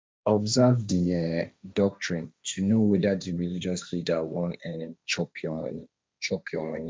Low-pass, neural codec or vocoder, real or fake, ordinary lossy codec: none; codec, 16 kHz, 1.1 kbps, Voila-Tokenizer; fake; none